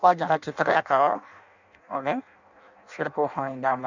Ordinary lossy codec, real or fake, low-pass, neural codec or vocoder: none; fake; 7.2 kHz; codec, 16 kHz in and 24 kHz out, 0.6 kbps, FireRedTTS-2 codec